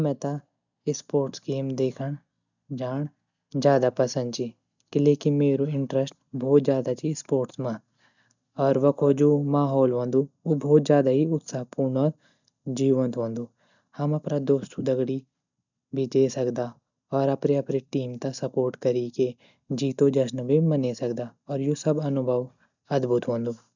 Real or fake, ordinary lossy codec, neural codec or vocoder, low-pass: real; none; none; 7.2 kHz